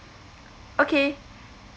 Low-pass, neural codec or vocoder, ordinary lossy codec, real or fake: none; none; none; real